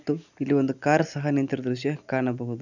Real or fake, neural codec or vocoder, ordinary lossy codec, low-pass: real; none; none; 7.2 kHz